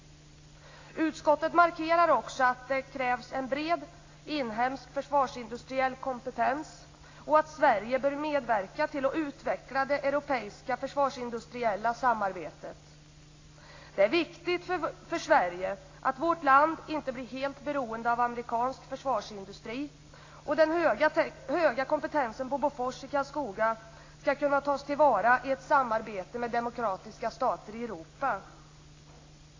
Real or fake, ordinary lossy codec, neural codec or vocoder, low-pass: real; AAC, 32 kbps; none; 7.2 kHz